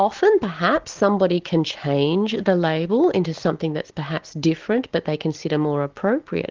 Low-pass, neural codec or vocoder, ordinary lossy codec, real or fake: 7.2 kHz; none; Opus, 16 kbps; real